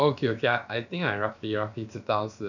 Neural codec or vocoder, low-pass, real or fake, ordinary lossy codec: codec, 16 kHz, about 1 kbps, DyCAST, with the encoder's durations; 7.2 kHz; fake; none